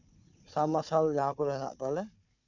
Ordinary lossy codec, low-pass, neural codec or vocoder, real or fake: none; 7.2 kHz; codec, 16 kHz, 4 kbps, FunCodec, trained on Chinese and English, 50 frames a second; fake